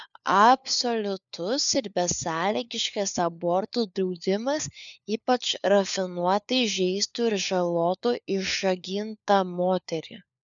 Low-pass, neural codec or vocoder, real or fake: 7.2 kHz; codec, 16 kHz, 4 kbps, FunCodec, trained on LibriTTS, 50 frames a second; fake